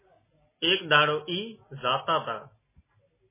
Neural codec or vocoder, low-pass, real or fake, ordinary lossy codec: none; 3.6 kHz; real; MP3, 16 kbps